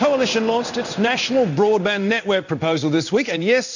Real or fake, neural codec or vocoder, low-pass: fake; codec, 16 kHz in and 24 kHz out, 1 kbps, XY-Tokenizer; 7.2 kHz